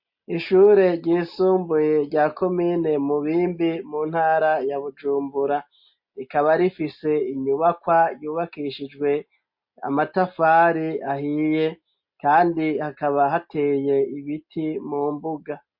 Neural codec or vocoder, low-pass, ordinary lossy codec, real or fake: none; 5.4 kHz; MP3, 32 kbps; real